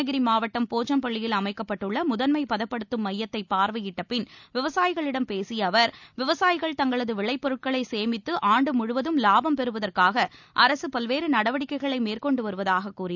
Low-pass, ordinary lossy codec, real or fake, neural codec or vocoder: 7.2 kHz; none; real; none